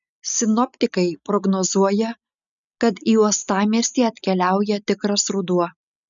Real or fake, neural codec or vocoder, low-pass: real; none; 7.2 kHz